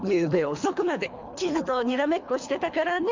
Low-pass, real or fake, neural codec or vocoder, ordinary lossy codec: 7.2 kHz; fake; codec, 24 kHz, 3 kbps, HILCodec; MP3, 64 kbps